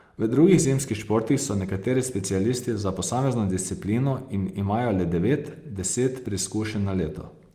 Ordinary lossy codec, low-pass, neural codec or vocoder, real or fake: Opus, 32 kbps; 14.4 kHz; none; real